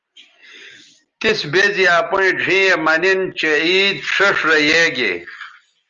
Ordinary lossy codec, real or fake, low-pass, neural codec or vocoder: Opus, 32 kbps; real; 7.2 kHz; none